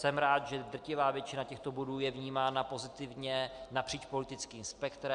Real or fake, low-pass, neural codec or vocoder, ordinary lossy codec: real; 9.9 kHz; none; Opus, 64 kbps